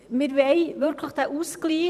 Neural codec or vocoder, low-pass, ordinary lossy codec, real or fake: vocoder, 44.1 kHz, 128 mel bands every 512 samples, BigVGAN v2; 14.4 kHz; none; fake